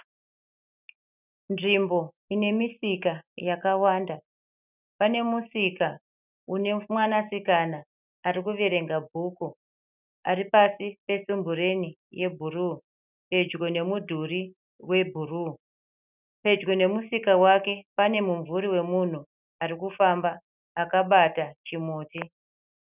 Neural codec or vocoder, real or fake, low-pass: none; real; 3.6 kHz